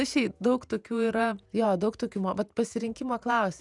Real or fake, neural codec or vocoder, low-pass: fake; vocoder, 48 kHz, 128 mel bands, Vocos; 10.8 kHz